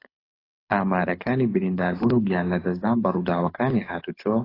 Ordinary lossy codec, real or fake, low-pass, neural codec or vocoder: AAC, 24 kbps; fake; 5.4 kHz; codec, 24 kHz, 6 kbps, HILCodec